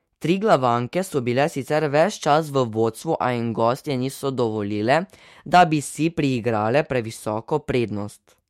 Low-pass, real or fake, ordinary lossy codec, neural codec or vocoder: 19.8 kHz; fake; MP3, 64 kbps; autoencoder, 48 kHz, 128 numbers a frame, DAC-VAE, trained on Japanese speech